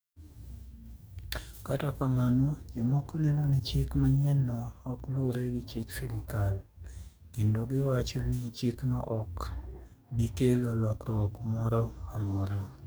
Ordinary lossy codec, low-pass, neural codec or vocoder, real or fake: none; none; codec, 44.1 kHz, 2.6 kbps, DAC; fake